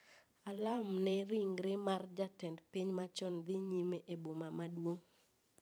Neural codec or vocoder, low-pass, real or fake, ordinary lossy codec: vocoder, 44.1 kHz, 128 mel bands every 512 samples, BigVGAN v2; none; fake; none